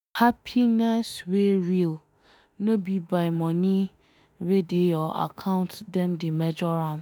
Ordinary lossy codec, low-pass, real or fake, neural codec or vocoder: none; none; fake; autoencoder, 48 kHz, 32 numbers a frame, DAC-VAE, trained on Japanese speech